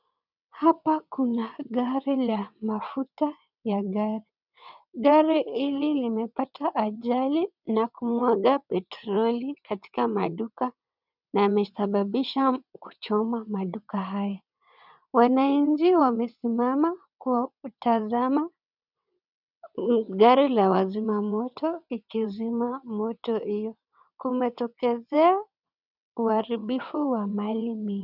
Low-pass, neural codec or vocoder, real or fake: 5.4 kHz; vocoder, 22.05 kHz, 80 mel bands, WaveNeXt; fake